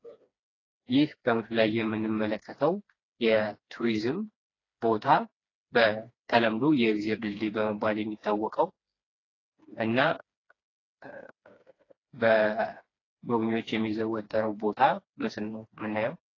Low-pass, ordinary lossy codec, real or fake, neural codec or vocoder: 7.2 kHz; AAC, 32 kbps; fake; codec, 16 kHz, 2 kbps, FreqCodec, smaller model